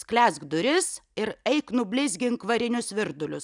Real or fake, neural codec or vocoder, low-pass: real; none; 10.8 kHz